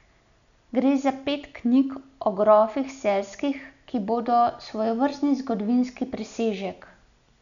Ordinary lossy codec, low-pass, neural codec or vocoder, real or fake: none; 7.2 kHz; none; real